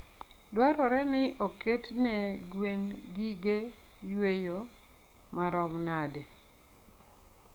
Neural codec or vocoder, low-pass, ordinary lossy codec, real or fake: codec, 44.1 kHz, 7.8 kbps, DAC; none; none; fake